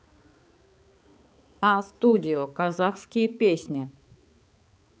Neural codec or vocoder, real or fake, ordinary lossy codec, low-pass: codec, 16 kHz, 4 kbps, X-Codec, HuBERT features, trained on balanced general audio; fake; none; none